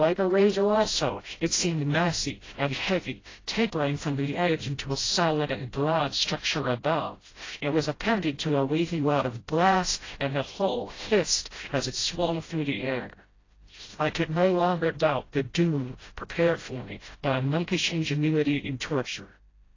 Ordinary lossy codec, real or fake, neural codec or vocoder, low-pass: AAC, 32 kbps; fake; codec, 16 kHz, 0.5 kbps, FreqCodec, smaller model; 7.2 kHz